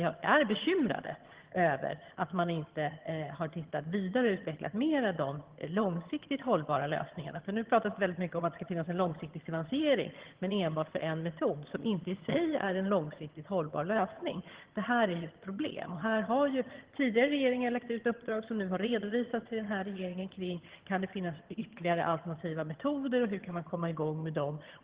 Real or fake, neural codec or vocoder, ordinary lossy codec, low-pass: fake; vocoder, 22.05 kHz, 80 mel bands, HiFi-GAN; Opus, 16 kbps; 3.6 kHz